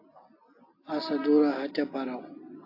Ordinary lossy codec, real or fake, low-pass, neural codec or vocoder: AAC, 32 kbps; real; 5.4 kHz; none